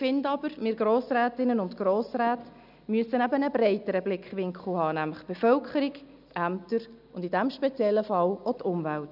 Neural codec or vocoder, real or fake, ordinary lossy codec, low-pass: none; real; none; 5.4 kHz